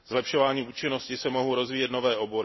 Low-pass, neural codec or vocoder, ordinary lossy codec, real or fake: 7.2 kHz; none; MP3, 24 kbps; real